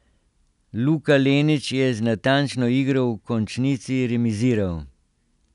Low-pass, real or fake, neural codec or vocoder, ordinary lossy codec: 10.8 kHz; real; none; none